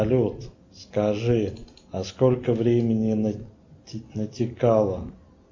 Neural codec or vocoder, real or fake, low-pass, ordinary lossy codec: none; real; 7.2 kHz; MP3, 48 kbps